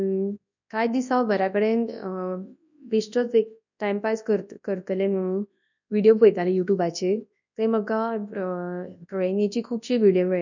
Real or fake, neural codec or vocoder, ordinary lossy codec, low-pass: fake; codec, 24 kHz, 0.9 kbps, WavTokenizer, large speech release; none; 7.2 kHz